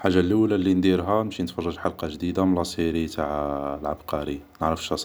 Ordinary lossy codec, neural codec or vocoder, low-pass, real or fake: none; none; none; real